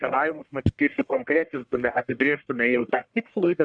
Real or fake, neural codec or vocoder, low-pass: fake; codec, 44.1 kHz, 1.7 kbps, Pupu-Codec; 9.9 kHz